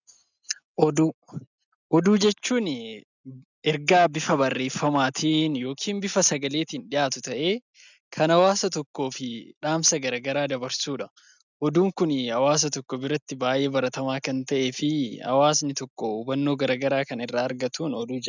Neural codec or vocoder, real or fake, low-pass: none; real; 7.2 kHz